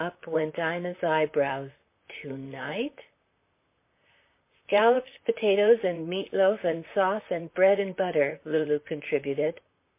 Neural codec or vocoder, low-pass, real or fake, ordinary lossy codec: vocoder, 44.1 kHz, 128 mel bands, Pupu-Vocoder; 3.6 kHz; fake; MP3, 24 kbps